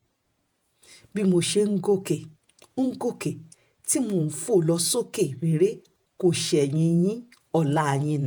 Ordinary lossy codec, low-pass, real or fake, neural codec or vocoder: none; none; fake; vocoder, 48 kHz, 128 mel bands, Vocos